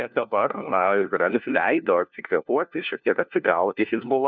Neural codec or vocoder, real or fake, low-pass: codec, 16 kHz, 1 kbps, FunCodec, trained on LibriTTS, 50 frames a second; fake; 7.2 kHz